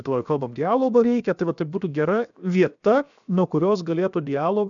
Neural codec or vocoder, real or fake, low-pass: codec, 16 kHz, 0.7 kbps, FocalCodec; fake; 7.2 kHz